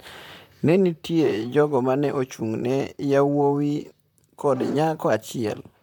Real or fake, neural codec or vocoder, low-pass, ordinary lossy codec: fake; vocoder, 44.1 kHz, 128 mel bands, Pupu-Vocoder; 19.8 kHz; MP3, 96 kbps